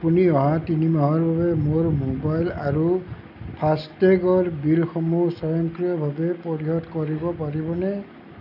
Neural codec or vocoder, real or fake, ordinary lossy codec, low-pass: none; real; none; 5.4 kHz